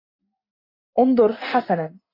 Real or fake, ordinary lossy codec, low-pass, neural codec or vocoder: real; AAC, 32 kbps; 5.4 kHz; none